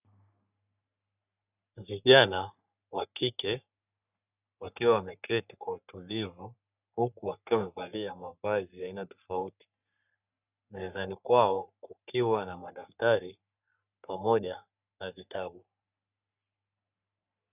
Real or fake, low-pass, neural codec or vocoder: fake; 3.6 kHz; codec, 44.1 kHz, 3.4 kbps, Pupu-Codec